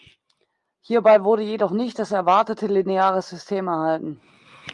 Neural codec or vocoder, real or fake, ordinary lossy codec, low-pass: none; real; Opus, 32 kbps; 10.8 kHz